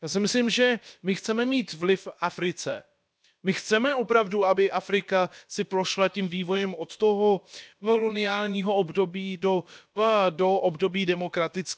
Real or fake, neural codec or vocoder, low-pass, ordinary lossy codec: fake; codec, 16 kHz, about 1 kbps, DyCAST, with the encoder's durations; none; none